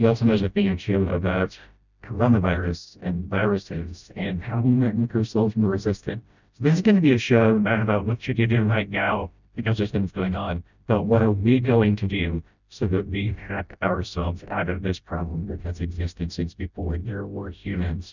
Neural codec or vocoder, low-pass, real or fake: codec, 16 kHz, 0.5 kbps, FreqCodec, smaller model; 7.2 kHz; fake